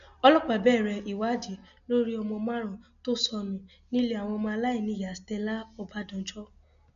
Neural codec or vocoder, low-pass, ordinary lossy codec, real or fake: none; 7.2 kHz; none; real